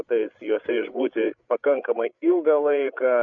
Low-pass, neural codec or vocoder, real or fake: 7.2 kHz; codec, 16 kHz, 8 kbps, FreqCodec, larger model; fake